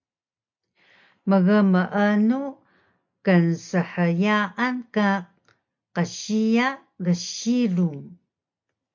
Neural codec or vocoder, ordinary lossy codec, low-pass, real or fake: none; MP3, 48 kbps; 7.2 kHz; real